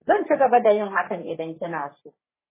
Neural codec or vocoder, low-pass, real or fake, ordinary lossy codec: codec, 44.1 kHz, 3.4 kbps, Pupu-Codec; 3.6 kHz; fake; MP3, 16 kbps